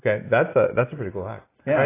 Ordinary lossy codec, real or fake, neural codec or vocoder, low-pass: AAC, 16 kbps; real; none; 3.6 kHz